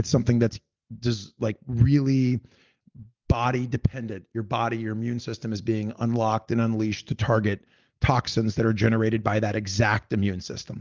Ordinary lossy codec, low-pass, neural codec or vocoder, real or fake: Opus, 32 kbps; 7.2 kHz; none; real